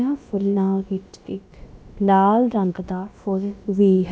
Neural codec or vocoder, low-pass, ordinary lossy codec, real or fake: codec, 16 kHz, about 1 kbps, DyCAST, with the encoder's durations; none; none; fake